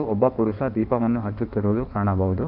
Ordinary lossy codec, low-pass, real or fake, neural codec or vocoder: none; 5.4 kHz; fake; codec, 16 kHz in and 24 kHz out, 1.1 kbps, FireRedTTS-2 codec